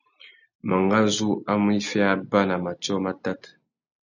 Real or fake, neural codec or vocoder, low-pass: real; none; 7.2 kHz